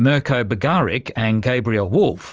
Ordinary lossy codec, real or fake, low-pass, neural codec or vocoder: Opus, 24 kbps; fake; 7.2 kHz; vocoder, 44.1 kHz, 128 mel bands every 512 samples, BigVGAN v2